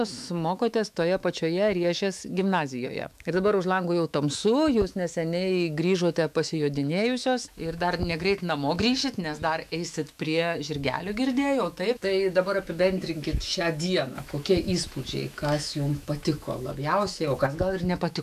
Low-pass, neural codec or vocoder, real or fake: 14.4 kHz; autoencoder, 48 kHz, 128 numbers a frame, DAC-VAE, trained on Japanese speech; fake